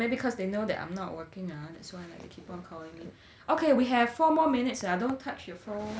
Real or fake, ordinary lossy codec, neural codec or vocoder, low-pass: real; none; none; none